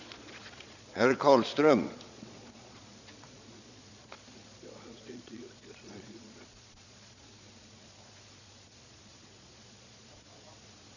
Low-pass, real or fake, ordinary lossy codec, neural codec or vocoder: 7.2 kHz; fake; none; vocoder, 22.05 kHz, 80 mel bands, Vocos